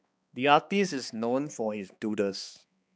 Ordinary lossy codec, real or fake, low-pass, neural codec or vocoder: none; fake; none; codec, 16 kHz, 2 kbps, X-Codec, HuBERT features, trained on balanced general audio